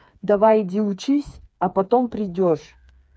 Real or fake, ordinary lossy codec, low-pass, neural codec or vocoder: fake; none; none; codec, 16 kHz, 4 kbps, FreqCodec, smaller model